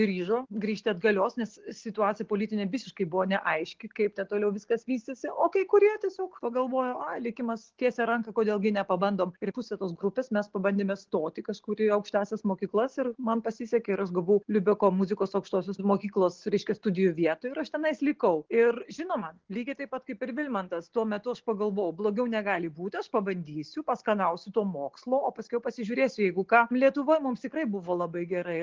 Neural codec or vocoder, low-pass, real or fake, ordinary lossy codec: none; 7.2 kHz; real; Opus, 16 kbps